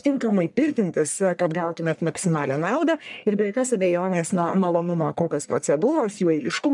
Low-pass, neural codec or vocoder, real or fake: 10.8 kHz; codec, 44.1 kHz, 1.7 kbps, Pupu-Codec; fake